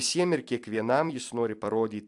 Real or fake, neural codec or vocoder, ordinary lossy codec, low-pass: fake; vocoder, 44.1 kHz, 128 mel bands every 256 samples, BigVGAN v2; AAC, 64 kbps; 10.8 kHz